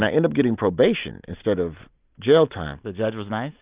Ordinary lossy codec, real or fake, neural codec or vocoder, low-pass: Opus, 32 kbps; real; none; 3.6 kHz